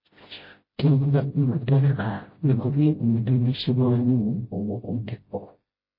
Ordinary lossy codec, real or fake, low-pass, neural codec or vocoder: MP3, 24 kbps; fake; 5.4 kHz; codec, 16 kHz, 0.5 kbps, FreqCodec, smaller model